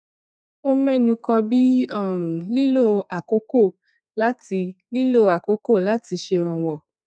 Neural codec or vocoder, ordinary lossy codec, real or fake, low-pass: codec, 32 kHz, 1.9 kbps, SNAC; none; fake; 9.9 kHz